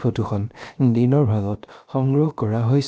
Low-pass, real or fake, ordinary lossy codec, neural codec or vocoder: none; fake; none; codec, 16 kHz, 0.7 kbps, FocalCodec